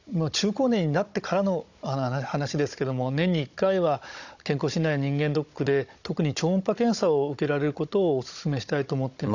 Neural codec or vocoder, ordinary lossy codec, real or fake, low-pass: codec, 16 kHz, 16 kbps, FunCodec, trained on Chinese and English, 50 frames a second; none; fake; 7.2 kHz